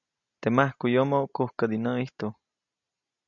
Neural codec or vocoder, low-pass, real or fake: none; 7.2 kHz; real